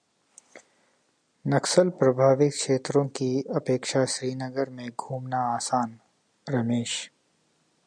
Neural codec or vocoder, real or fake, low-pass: none; real; 9.9 kHz